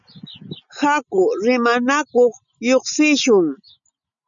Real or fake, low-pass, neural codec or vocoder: real; 7.2 kHz; none